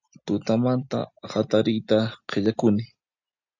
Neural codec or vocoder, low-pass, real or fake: none; 7.2 kHz; real